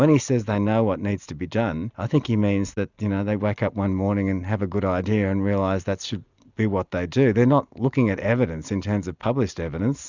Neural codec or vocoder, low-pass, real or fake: none; 7.2 kHz; real